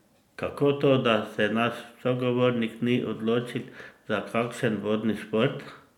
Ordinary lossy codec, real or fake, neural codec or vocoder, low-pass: none; real; none; 19.8 kHz